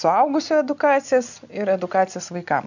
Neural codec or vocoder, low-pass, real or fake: none; 7.2 kHz; real